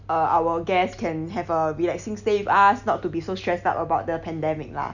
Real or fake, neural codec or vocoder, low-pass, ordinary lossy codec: real; none; 7.2 kHz; none